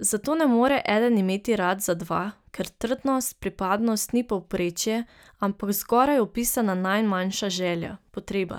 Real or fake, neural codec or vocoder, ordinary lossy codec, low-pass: real; none; none; none